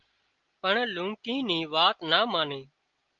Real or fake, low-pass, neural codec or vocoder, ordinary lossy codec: real; 7.2 kHz; none; Opus, 32 kbps